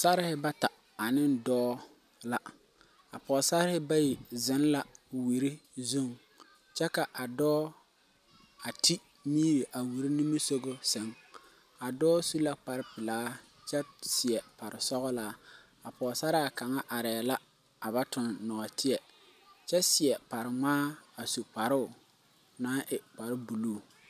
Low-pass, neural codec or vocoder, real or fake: 14.4 kHz; none; real